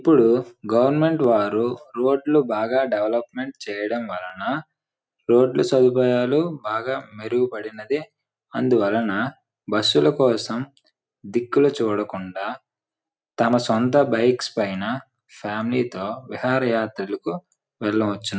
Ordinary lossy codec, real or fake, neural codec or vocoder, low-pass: none; real; none; none